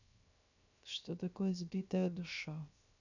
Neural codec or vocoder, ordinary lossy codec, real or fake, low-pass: codec, 16 kHz, 0.7 kbps, FocalCodec; none; fake; 7.2 kHz